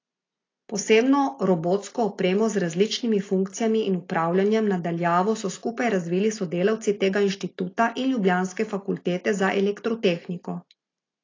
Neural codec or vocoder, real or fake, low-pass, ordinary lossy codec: none; real; 7.2 kHz; AAC, 32 kbps